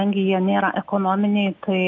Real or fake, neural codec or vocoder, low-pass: real; none; 7.2 kHz